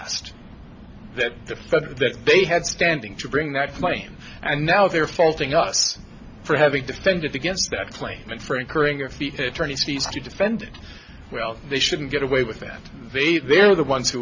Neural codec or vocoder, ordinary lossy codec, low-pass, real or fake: none; AAC, 48 kbps; 7.2 kHz; real